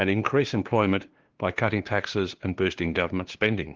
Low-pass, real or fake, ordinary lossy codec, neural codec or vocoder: 7.2 kHz; fake; Opus, 16 kbps; codec, 16 kHz, 2 kbps, FunCodec, trained on LibriTTS, 25 frames a second